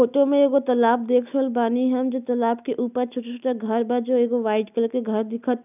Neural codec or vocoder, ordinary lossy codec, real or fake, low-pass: none; none; real; 3.6 kHz